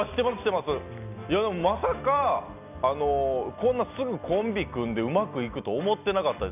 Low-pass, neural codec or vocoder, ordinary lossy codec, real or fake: 3.6 kHz; none; none; real